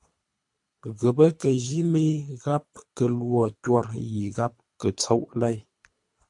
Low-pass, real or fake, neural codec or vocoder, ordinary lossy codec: 10.8 kHz; fake; codec, 24 kHz, 3 kbps, HILCodec; MP3, 64 kbps